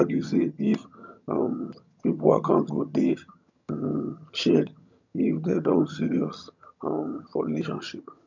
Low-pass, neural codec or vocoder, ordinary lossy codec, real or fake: 7.2 kHz; vocoder, 22.05 kHz, 80 mel bands, HiFi-GAN; none; fake